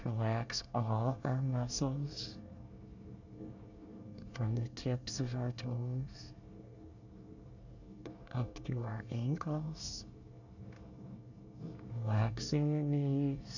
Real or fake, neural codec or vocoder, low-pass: fake; codec, 24 kHz, 1 kbps, SNAC; 7.2 kHz